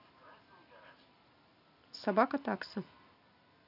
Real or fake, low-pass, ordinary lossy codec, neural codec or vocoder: real; 5.4 kHz; none; none